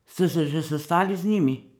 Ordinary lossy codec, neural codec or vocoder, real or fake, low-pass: none; codec, 44.1 kHz, 7.8 kbps, DAC; fake; none